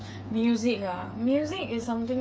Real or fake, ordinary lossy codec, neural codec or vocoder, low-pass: fake; none; codec, 16 kHz, 8 kbps, FreqCodec, smaller model; none